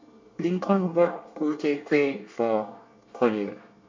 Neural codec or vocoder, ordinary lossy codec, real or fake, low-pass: codec, 24 kHz, 1 kbps, SNAC; MP3, 48 kbps; fake; 7.2 kHz